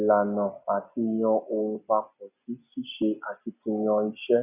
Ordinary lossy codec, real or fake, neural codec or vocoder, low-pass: none; real; none; 3.6 kHz